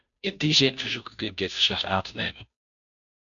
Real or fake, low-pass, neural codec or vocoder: fake; 7.2 kHz; codec, 16 kHz, 0.5 kbps, FunCodec, trained on Chinese and English, 25 frames a second